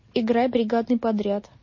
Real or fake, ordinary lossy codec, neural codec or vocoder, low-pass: real; MP3, 32 kbps; none; 7.2 kHz